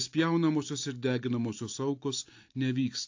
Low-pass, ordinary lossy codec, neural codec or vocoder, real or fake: 7.2 kHz; AAC, 48 kbps; none; real